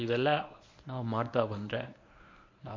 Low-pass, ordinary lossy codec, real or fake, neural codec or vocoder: 7.2 kHz; MP3, 48 kbps; fake; codec, 24 kHz, 0.9 kbps, WavTokenizer, medium speech release version 1